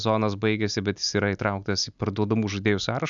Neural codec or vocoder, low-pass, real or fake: none; 7.2 kHz; real